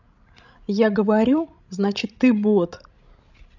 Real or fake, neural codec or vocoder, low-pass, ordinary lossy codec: fake; codec, 16 kHz, 16 kbps, FreqCodec, larger model; 7.2 kHz; none